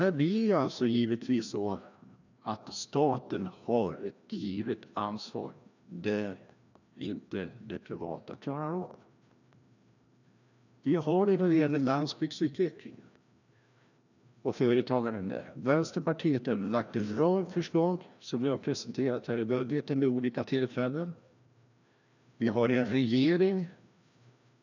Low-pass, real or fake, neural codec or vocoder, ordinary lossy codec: 7.2 kHz; fake; codec, 16 kHz, 1 kbps, FreqCodec, larger model; none